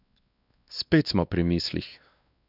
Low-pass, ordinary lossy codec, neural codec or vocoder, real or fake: 5.4 kHz; none; codec, 16 kHz, 2 kbps, X-Codec, WavLM features, trained on Multilingual LibriSpeech; fake